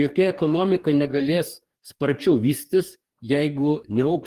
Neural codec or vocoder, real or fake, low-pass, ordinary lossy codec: codec, 44.1 kHz, 2.6 kbps, DAC; fake; 14.4 kHz; Opus, 24 kbps